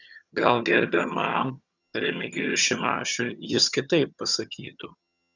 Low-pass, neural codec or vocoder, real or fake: 7.2 kHz; vocoder, 22.05 kHz, 80 mel bands, HiFi-GAN; fake